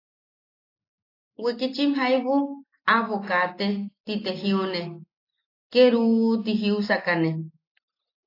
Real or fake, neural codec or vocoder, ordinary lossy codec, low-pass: real; none; AAC, 32 kbps; 5.4 kHz